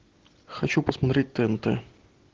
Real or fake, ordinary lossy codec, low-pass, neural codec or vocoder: real; Opus, 16 kbps; 7.2 kHz; none